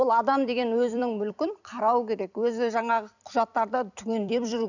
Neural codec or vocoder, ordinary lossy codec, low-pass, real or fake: vocoder, 44.1 kHz, 128 mel bands every 256 samples, BigVGAN v2; none; 7.2 kHz; fake